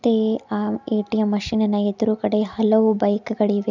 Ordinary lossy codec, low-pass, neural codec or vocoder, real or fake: none; 7.2 kHz; none; real